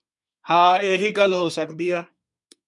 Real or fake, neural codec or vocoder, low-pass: fake; codec, 24 kHz, 1 kbps, SNAC; 10.8 kHz